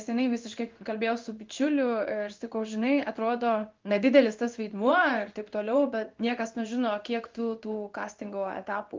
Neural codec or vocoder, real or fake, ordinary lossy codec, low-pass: codec, 16 kHz in and 24 kHz out, 1 kbps, XY-Tokenizer; fake; Opus, 24 kbps; 7.2 kHz